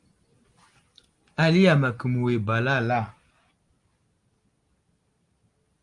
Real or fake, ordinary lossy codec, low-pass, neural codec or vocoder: real; Opus, 32 kbps; 10.8 kHz; none